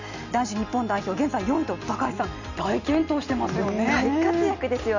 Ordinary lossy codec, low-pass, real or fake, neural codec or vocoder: none; 7.2 kHz; real; none